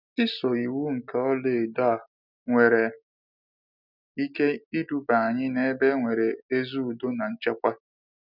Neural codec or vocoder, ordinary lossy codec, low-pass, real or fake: none; none; 5.4 kHz; real